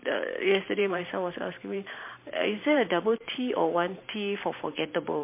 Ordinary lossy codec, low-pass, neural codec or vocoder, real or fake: MP3, 24 kbps; 3.6 kHz; vocoder, 44.1 kHz, 128 mel bands every 512 samples, BigVGAN v2; fake